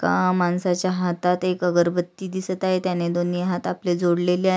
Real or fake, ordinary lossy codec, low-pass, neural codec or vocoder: real; none; none; none